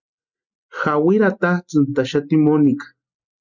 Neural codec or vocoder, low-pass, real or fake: none; 7.2 kHz; real